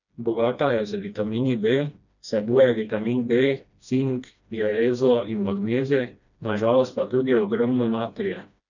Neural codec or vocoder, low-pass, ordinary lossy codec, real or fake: codec, 16 kHz, 1 kbps, FreqCodec, smaller model; 7.2 kHz; none; fake